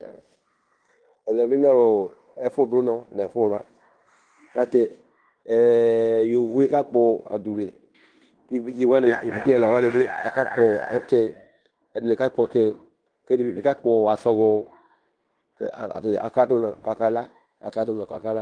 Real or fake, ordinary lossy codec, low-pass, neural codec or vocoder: fake; Opus, 24 kbps; 9.9 kHz; codec, 16 kHz in and 24 kHz out, 0.9 kbps, LongCat-Audio-Codec, fine tuned four codebook decoder